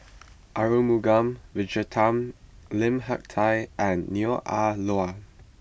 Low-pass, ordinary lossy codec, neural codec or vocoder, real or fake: none; none; none; real